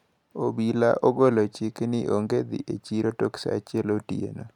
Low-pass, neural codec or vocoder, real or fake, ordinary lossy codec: 19.8 kHz; none; real; none